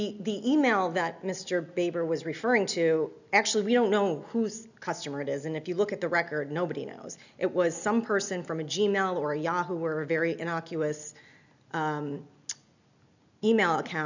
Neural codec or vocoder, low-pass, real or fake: none; 7.2 kHz; real